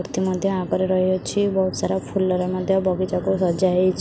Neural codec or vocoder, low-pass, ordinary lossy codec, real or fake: none; none; none; real